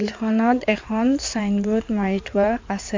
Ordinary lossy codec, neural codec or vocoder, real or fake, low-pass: MP3, 64 kbps; codec, 16 kHz in and 24 kHz out, 2.2 kbps, FireRedTTS-2 codec; fake; 7.2 kHz